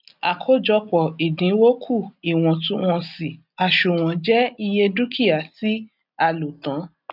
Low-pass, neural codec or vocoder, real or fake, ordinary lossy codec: 5.4 kHz; none; real; none